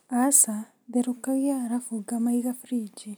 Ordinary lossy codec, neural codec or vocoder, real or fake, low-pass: none; none; real; none